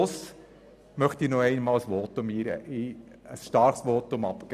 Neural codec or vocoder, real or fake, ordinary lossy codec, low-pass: none; real; none; 14.4 kHz